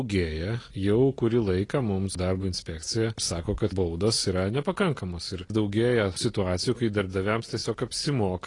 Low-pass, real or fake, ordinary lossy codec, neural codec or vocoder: 10.8 kHz; real; AAC, 32 kbps; none